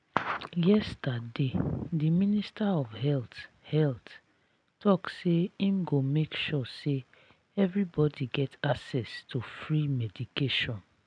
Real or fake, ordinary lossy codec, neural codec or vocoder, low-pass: real; none; none; 9.9 kHz